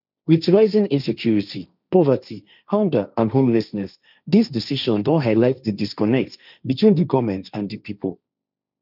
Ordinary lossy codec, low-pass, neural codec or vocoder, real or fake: none; 5.4 kHz; codec, 16 kHz, 1.1 kbps, Voila-Tokenizer; fake